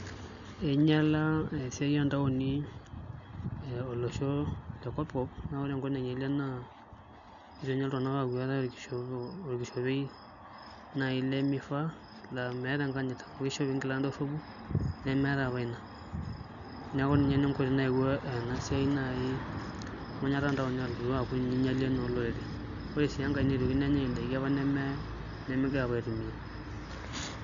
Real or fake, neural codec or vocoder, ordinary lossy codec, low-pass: real; none; none; 7.2 kHz